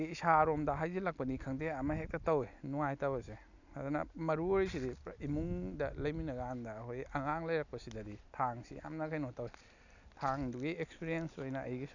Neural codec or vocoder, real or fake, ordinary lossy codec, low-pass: none; real; none; 7.2 kHz